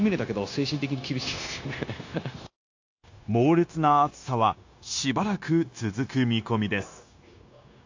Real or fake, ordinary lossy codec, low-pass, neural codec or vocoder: fake; AAC, 48 kbps; 7.2 kHz; codec, 16 kHz, 0.9 kbps, LongCat-Audio-Codec